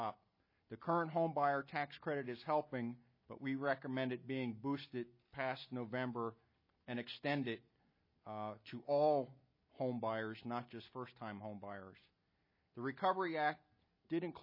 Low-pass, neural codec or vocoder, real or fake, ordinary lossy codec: 5.4 kHz; none; real; MP3, 24 kbps